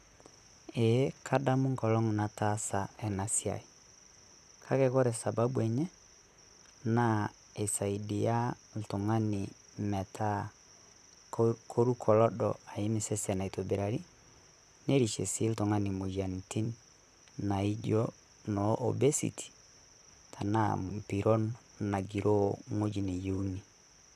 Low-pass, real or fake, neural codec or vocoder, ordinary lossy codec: 14.4 kHz; fake; vocoder, 44.1 kHz, 128 mel bands, Pupu-Vocoder; none